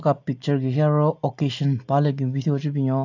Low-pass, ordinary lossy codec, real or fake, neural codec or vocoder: 7.2 kHz; AAC, 48 kbps; real; none